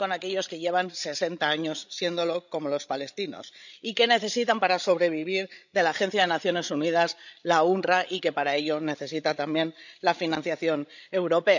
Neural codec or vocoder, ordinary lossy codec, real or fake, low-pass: codec, 16 kHz, 16 kbps, FreqCodec, larger model; none; fake; 7.2 kHz